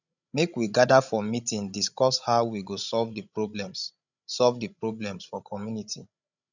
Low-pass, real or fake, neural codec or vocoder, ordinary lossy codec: 7.2 kHz; fake; codec, 16 kHz, 16 kbps, FreqCodec, larger model; none